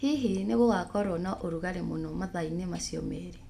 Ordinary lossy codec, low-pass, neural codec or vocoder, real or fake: none; 14.4 kHz; none; real